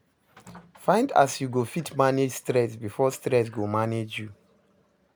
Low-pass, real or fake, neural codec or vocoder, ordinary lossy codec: none; real; none; none